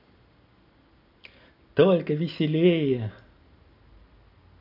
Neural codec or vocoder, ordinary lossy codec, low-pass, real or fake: none; none; 5.4 kHz; real